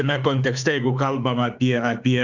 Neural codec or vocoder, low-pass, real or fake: autoencoder, 48 kHz, 32 numbers a frame, DAC-VAE, trained on Japanese speech; 7.2 kHz; fake